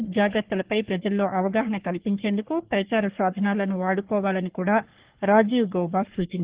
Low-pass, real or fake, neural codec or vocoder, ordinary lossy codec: 3.6 kHz; fake; codec, 44.1 kHz, 3.4 kbps, Pupu-Codec; Opus, 16 kbps